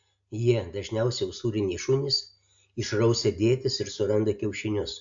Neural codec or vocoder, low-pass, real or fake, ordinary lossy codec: none; 7.2 kHz; real; AAC, 64 kbps